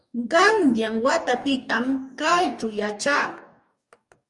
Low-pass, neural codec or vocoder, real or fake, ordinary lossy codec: 10.8 kHz; codec, 44.1 kHz, 2.6 kbps, DAC; fake; Opus, 64 kbps